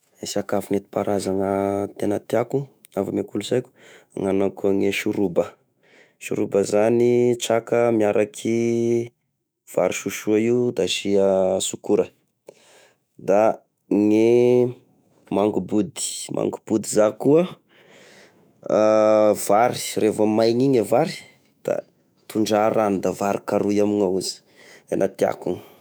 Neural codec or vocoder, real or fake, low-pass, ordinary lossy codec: autoencoder, 48 kHz, 128 numbers a frame, DAC-VAE, trained on Japanese speech; fake; none; none